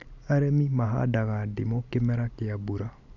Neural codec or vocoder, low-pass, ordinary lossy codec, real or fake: none; 7.2 kHz; none; real